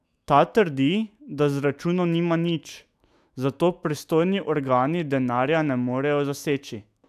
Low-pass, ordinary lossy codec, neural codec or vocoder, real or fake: 14.4 kHz; AAC, 96 kbps; autoencoder, 48 kHz, 128 numbers a frame, DAC-VAE, trained on Japanese speech; fake